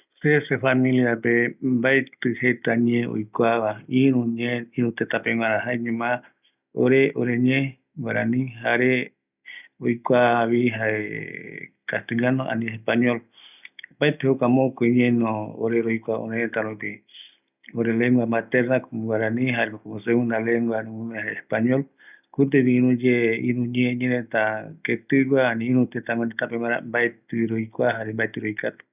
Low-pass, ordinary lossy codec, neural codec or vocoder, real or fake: 3.6 kHz; none; none; real